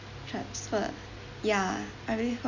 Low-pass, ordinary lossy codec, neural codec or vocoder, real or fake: 7.2 kHz; none; none; real